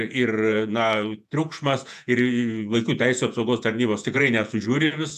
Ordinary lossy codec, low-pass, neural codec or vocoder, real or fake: AAC, 96 kbps; 14.4 kHz; vocoder, 48 kHz, 128 mel bands, Vocos; fake